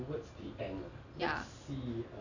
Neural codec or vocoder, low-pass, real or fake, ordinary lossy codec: none; 7.2 kHz; real; none